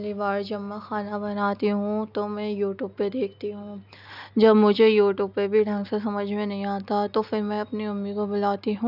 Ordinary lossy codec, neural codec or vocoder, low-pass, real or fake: none; none; 5.4 kHz; real